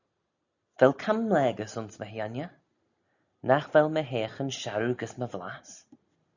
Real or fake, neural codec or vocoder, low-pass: real; none; 7.2 kHz